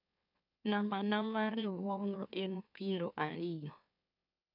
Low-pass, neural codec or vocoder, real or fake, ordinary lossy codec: 5.4 kHz; autoencoder, 44.1 kHz, a latent of 192 numbers a frame, MeloTTS; fake; none